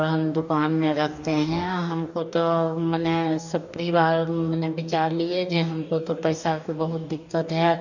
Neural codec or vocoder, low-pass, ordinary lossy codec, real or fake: codec, 44.1 kHz, 2.6 kbps, DAC; 7.2 kHz; none; fake